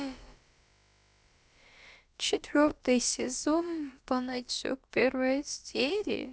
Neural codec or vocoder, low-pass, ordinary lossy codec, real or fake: codec, 16 kHz, about 1 kbps, DyCAST, with the encoder's durations; none; none; fake